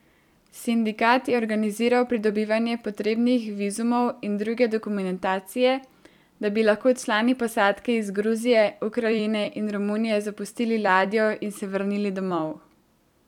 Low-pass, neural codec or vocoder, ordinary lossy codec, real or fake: 19.8 kHz; vocoder, 44.1 kHz, 128 mel bands every 256 samples, BigVGAN v2; none; fake